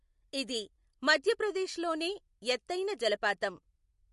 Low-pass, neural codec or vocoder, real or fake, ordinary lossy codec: 14.4 kHz; none; real; MP3, 48 kbps